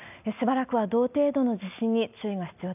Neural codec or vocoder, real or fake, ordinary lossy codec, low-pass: none; real; none; 3.6 kHz